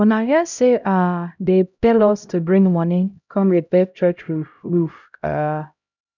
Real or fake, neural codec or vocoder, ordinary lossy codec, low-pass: fake; codec, 16 kHz, 0.5 kbps, X-Codec, HuBERT features, trained on LibriSpeech; none; 7.2 kHz